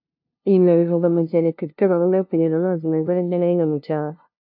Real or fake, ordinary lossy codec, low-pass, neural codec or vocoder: fake; AAC, 48 kbps; 5.4 kHz; codec, 16 kHz, 0.5 kbps, FunCodec, trained on LibriTTS, 25 frames a second